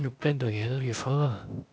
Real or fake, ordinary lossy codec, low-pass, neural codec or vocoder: fake; none; none; codec, 16 kHz, 0.8 kbps, ZipCodec